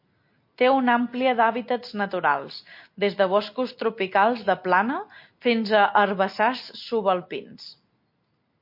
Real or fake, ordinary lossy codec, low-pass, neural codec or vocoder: real; MP3, 32 kbps; 5.4 kHz; none